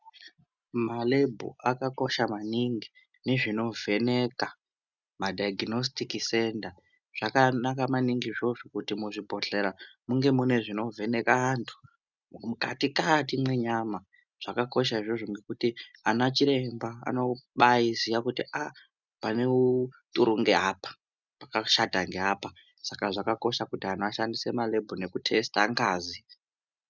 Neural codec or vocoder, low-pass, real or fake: none; 7.2 kHz; real